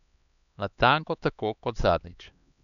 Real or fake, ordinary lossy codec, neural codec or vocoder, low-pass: fake; none; codec, 16 kHz, 2 kbps, X-Codec, HuBERT features, trained on LibriSpeech; 7.2 kHz